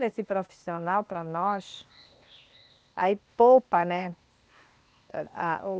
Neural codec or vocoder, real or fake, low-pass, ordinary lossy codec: codec, 16 kHz, 0.8 kbps, ZipCodec; fake; none; none